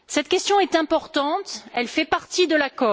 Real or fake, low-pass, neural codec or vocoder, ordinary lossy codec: real; none; none; none